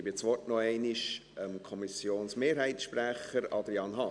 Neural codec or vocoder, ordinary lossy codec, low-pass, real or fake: none; none; 9.9 kHz; real